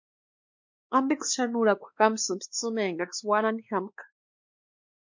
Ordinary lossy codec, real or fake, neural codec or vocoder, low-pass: MP3, 48 kbps; fake; codec, 16 kHz, 2 kbps, X-Codec, WavLM features, trained on Multilingual LibriSpeech; 7.2 kHz